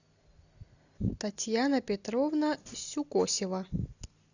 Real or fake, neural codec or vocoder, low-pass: real; none; 7.2 kHz